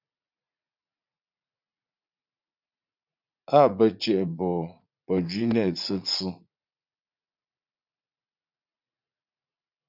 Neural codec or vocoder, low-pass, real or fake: vocoder, 44.1 kHz, 80 mel bands, Vocos; 5.4 kHz; fake